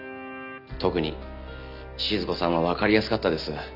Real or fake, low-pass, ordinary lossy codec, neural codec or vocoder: real; 5.4 kHz; none; none